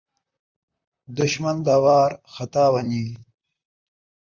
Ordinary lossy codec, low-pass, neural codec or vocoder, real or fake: Opus, 64 kbps; 7.2 kHz; vocoder, 44.1 kHz, 128 mel bands, Pupu-Vocoder; fake